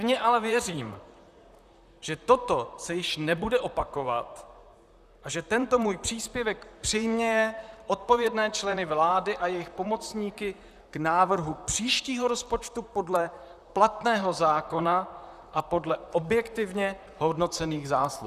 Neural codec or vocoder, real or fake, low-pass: vocoder, 44.1 kHz, 128 mel bands, Pupu-Vocoder; fake; 14.4 kHz